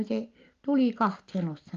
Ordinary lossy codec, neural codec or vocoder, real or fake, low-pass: Opus, 32 kbps; none; real; 7.2 kHz